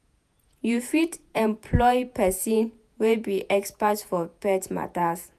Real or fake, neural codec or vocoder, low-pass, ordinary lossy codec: fake; vocoder, 48 kHz, 128 mel bands, Vocos; 14.4 kHz; none